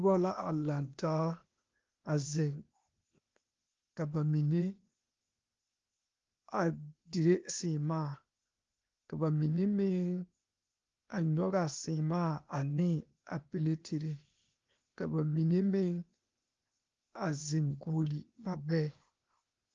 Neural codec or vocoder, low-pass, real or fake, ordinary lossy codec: codec, 16 kHz, 0.8 kbps, ZipCodec; 7.2 kHz; fake; Opus, 24 kbps